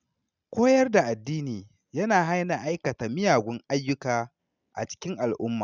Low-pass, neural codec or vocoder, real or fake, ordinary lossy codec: 7.2 kHz; none; real; none